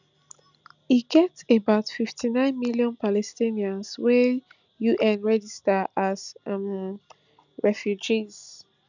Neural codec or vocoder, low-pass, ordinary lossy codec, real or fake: none; 7.2 kHz; none; real